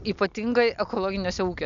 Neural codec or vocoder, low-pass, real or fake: none; 7.2 kHz; real